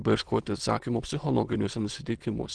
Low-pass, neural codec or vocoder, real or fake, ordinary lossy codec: 9.9 kHz; autoencoder, 22.05 kHz, a latent of 192 numbers a frame, VITS, trained on many speakers; fake; Opus, 16 kbps